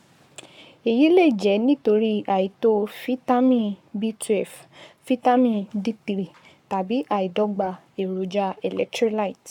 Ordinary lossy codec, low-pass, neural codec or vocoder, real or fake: MP3, 96 kbps; 19.8 kHz; codec, 44.1 kHz, 7.8 kbps, Pupu-Codec; fake